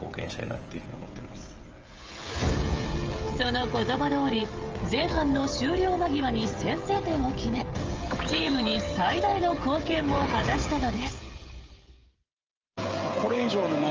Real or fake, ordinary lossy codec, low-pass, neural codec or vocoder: fake; Opus, 24 kbps; 7.2 kHz; codec, 16 kHz, 16 kbps, FreqCodec, smaller model